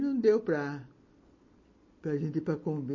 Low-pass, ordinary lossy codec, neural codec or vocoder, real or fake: 7.2 kHz; MP3, 32 kbps; none; real